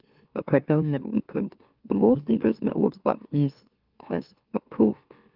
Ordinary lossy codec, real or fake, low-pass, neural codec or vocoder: Opus, 32 kbps; fake; 5.4 kHz; autoencoder, 44.1 kHz, a latent of 192 numbers a frame, MeloTTS